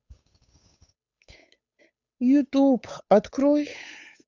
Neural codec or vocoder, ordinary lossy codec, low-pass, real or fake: codec, 16 kHz, 8 kbps, FunCodec, trained on Chinese and English, 25 frames a second; none; 7.2 kHz; fake